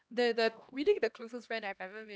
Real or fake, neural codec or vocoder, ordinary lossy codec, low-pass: fake; codec, 16 kHz, 1 kbps, X-Codec, HuBERT features, trained on LibriSpeech; none; none